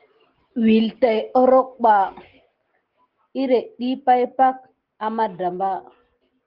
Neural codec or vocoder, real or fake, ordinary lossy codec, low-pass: none; real; Opus, 16 kbps; 5.4 kHz